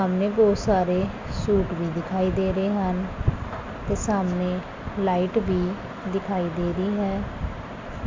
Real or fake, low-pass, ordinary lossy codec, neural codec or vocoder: real; 7.2 kHz; MP3, 64 kbps; none